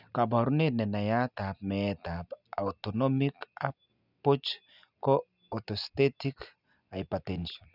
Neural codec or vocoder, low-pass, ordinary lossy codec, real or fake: none; 5.4 kHz; none; real